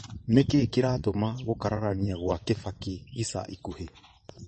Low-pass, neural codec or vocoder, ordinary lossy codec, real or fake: 9.9 kHz; vocoder, 22.05 kHz, 80 mel bands, WaveNeXt; MP3, 32 kbps; fake